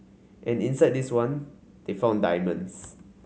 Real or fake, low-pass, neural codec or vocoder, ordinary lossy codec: real; none; none; none